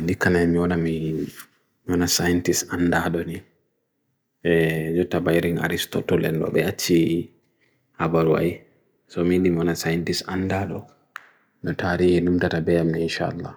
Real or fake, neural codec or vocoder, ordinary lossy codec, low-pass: real; none; none; none